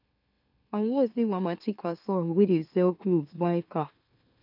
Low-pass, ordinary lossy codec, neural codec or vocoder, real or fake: 5.4 kHz; none; autoencoder, 44.1 kHz, a latent of 192 numbers a frame, MeloTTS; fake